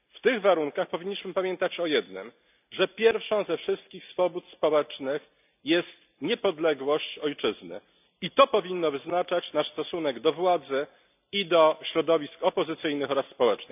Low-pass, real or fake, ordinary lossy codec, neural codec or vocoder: 3.6 kHz; real; none; none